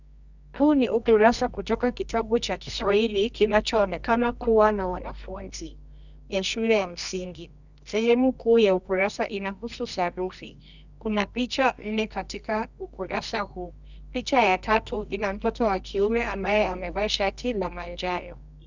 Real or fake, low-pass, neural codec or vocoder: fake; 7.2 kHz; codec, 24 kHz, 0.9 kbps, WavTokenizer, medium music audio release